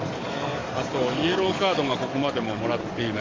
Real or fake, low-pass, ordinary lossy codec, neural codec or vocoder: fake; 7.2 kHz; Opus, 32 kbps; codec, 44.1 kHz, 7.8 kbps, DAC